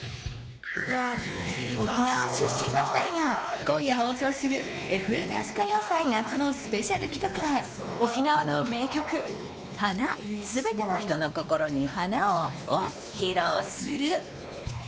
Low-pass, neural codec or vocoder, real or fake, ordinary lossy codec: none; codec, 16 kHz, 2 kbps, X-Codec, WavLM features, trained on Multilingual LibriSpeech; fake; none